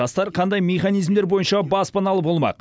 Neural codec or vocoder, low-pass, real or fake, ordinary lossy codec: none; none; real; none